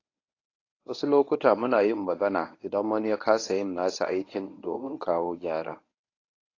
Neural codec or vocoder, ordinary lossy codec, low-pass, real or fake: codec, 24 kHz, 0.9 kbps, WavTokenizer, medium speech release version 1; AAC, 32 kbps; 7.2 kHz; fake